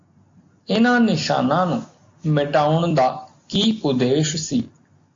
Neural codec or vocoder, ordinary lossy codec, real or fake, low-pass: none; AAC, 48 kbps; real; 7.2 kHz